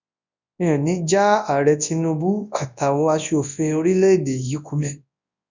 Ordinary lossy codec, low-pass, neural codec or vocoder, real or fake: none; 7.2 kHz; codec, 24 kHz, 0.9 kbps, WavTokenizer, large speech release; fake